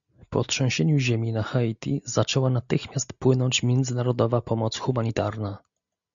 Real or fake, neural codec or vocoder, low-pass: real; none; 7.2 kHz